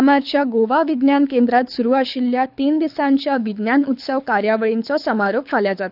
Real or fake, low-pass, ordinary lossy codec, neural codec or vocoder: fake; 5.4 kHz; none; codec, 24 kHz, 6 kbps, HILCodec